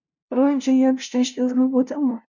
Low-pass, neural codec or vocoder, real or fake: 7.2 kHz; codec, 16 kHz, 0.5 kbps, FunCodec, trained on LibriTTS, 25 frames a second; fake